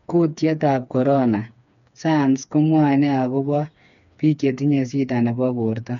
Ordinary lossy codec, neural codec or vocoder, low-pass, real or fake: none; codec, 16 kHz, 4 kbps, FreqCodec, smaller model; 7.2 kHz; fake